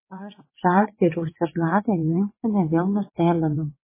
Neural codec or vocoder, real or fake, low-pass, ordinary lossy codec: vocoder, 22.05 kHz, 80 mel bands, WaveNeXt; fake; 3.6 kHz; MP3, 16 kbps